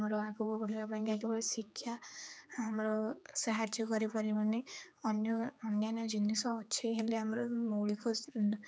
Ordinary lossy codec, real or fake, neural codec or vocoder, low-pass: none; fake; codec, 16 kHz, 4 kbps, X-Codec, HuBERT features, trained on general audio; none